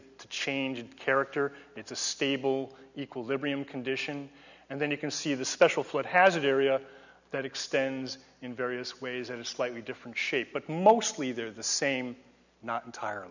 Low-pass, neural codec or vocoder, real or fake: 7.2 kHz; none; real